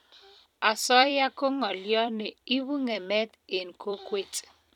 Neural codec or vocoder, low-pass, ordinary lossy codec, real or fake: vocoder, 44.1 kHz, 128 mel bands every 256 samples, BigVGAN v2; 19.8 kHz; none; fake